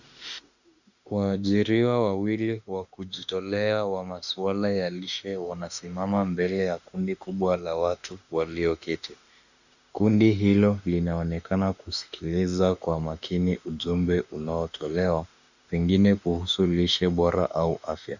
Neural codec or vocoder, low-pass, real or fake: autoencoder, 48 kHz, 32 numbers a frame, DAC-VAE, trained on Japanese speech; 7.2 kHz; fake